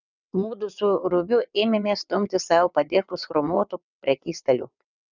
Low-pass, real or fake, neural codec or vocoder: 7.2 kHz; fake; vocoder, 22.05 kHz, 80 mel bands, WaveNeXt